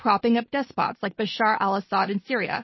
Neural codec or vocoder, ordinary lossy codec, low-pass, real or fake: none; MP3, 24 kbps; 7.2 kHz; real